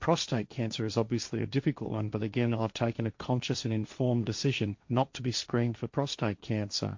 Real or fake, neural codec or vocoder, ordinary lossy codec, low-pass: fake; codec, 16 kHz, 1.1 kbps, Voila-Tokenizer; MP3, 48 kbps; 7.2 kHz